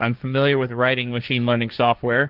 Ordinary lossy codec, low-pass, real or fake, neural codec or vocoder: Opus, 32 kbps; 5.4 kHz; fake; codec, 16 kHz, 1.1 kbps, Voila-Tokenizer